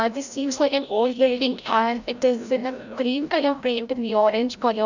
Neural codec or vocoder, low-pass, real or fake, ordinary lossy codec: codec, 16 kHz, 0.5 kbps, FreqCodec, larger model; 7.2 kHz; fake; none